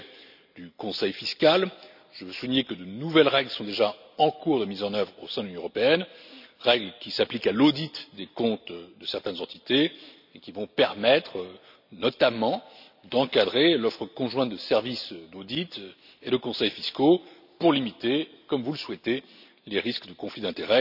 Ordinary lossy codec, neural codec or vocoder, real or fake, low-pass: none; none; real; 5.4 kHz